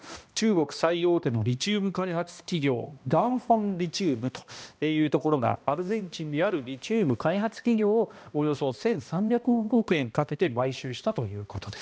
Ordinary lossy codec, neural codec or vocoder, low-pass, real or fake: none; codec, 16 kHz, 1 kbps, X-Codec, HuBERT features, trained on balanced general audio; none; fake